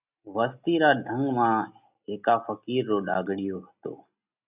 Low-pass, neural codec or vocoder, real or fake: 3.6 kHz; none; real